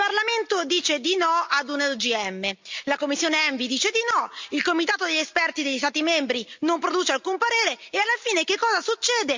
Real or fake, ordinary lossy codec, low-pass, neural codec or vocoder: real; MP3, 64 kbps; 7.2 kHz; none